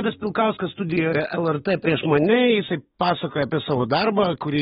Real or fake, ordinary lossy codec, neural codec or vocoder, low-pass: fake; AAC, 16 kbps; autoencoder, 48 kHz, 128 numbers a frame, DAC-VAE, trained on Japanese speech; 19.8 kHz